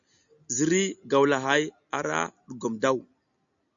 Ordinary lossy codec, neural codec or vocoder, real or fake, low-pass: MP3, 96 kbps; none; real; 7.2 kHz